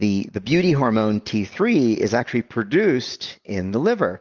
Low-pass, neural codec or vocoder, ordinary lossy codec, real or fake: 7.2 kHz; none; Opus, 24 kbps; real